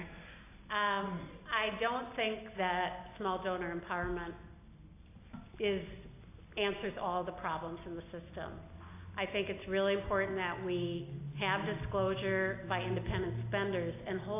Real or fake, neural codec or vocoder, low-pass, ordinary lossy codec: real; none; 3.6 kHz; AAC, 24 kbps